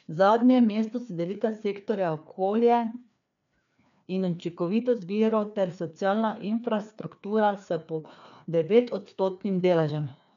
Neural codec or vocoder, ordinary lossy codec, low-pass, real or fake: codec, 16 kHz, 2 kbps, FreqCodec, larger model; MP3, 96 kbps; 7.2 kHz; fake